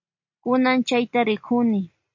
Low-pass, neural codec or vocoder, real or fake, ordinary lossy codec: 7.2 kHz; none; real; MP3, 48 kbps